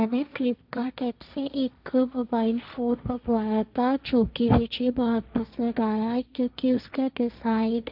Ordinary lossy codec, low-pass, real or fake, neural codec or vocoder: none; 5.4 kHz; fake; codec, 16 kHz, 1.1 kbps, Voila-Tokenizer